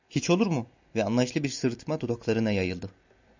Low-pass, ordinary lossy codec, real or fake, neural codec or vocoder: 7.2 kHz; MP3, 64 kbps; real; none